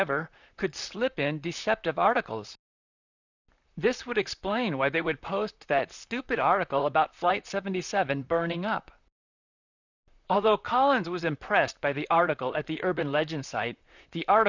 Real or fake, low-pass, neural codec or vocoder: fake; 7.2 kHz; vocoder, 44.1 kHz, 128 mel bands, Pupu-Vocoder